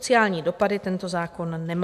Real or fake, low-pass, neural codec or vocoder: real; 14.4 kHz; none